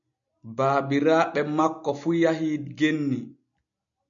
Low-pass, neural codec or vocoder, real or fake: 7.2 kHz; none; real